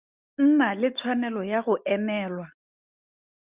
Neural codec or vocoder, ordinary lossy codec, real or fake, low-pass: none; Opus, 64 kbps; real; 3.6 kHz